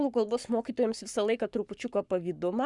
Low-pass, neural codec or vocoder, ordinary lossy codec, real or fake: 10.8 kHz; codec, 44.1 kHz, 7.8 kbps, Pupu-Codec; Opus, 64 kbps; fake